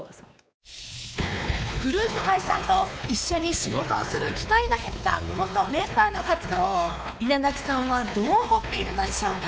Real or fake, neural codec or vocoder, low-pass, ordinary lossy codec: fake; codec, 16 kHz, 2 kbps, X-Codec, WavLM features, trained on Multilingual LibriSpeech; none; none